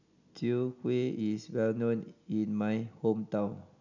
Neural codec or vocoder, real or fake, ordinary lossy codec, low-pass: none; real; none; 7.2 kHz